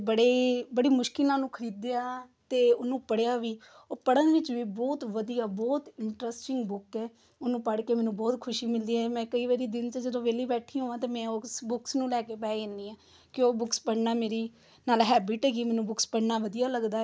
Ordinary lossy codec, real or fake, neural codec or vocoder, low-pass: none; real; none; none